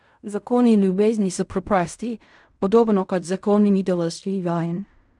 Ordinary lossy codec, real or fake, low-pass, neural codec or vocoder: none; fake; 10.8 kHz; codec, 16 kHz in and 24 kHz out, 0.4 kbps, LongCat-Audio-Codec, fine tuned four codebook decoder